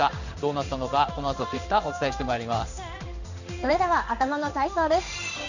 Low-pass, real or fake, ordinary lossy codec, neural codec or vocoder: 7.2 kHz; fake; none; codec, 16 kHz in and 24 kHz out, 1 kbps, XY-Tokenizer